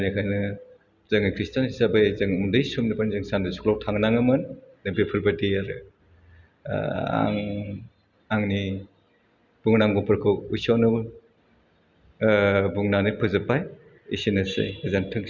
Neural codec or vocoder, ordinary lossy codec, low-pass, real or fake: none; Opus, 64 kbps; 7.2 kHz; real